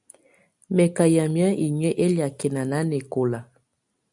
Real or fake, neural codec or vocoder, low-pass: real; none; 10.8 kHz